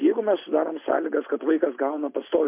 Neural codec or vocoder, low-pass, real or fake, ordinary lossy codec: none; 3.6 kHz; real; MP3, 32 kbps